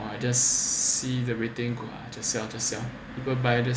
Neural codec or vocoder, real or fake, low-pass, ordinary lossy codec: none; real; none; none